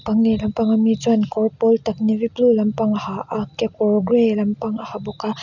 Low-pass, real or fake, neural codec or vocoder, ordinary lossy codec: 7.2 kHz; real; none; none